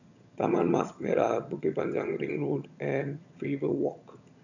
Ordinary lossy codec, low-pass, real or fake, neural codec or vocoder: none; 7.2 kHz; fake; vocoder, 22.05 kHz, 80 mel bands, HiFi-GAN